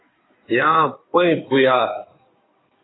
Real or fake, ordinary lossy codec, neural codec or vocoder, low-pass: fake; AAC, 16 kbps; codec, 16 kHz in and 24 kHz out, 2.2 kbps, FireRedTTS-2 codec; 7.2 kHz